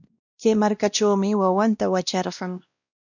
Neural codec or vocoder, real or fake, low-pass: codec, 16 kHz, 1 kbps, X-Codec, WavLM features, trained on Multilingual LibriSpeech; fake; 7.2 kHz